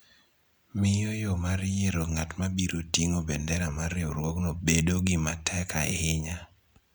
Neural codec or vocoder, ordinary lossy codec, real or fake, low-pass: none; none; real; none